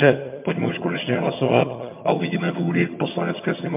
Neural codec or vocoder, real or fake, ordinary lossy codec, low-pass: vocoder, 22.05 kHz, 80 mel bands, HiFi-GAN; fake; MP3, 32 kbps; 3.6 kHz